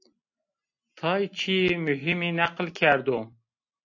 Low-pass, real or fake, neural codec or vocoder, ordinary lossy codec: 7.2 kHz; real; none; AAC, 48 kbps